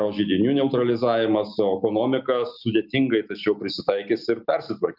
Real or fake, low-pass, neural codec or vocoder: real; 5.4 kHz; none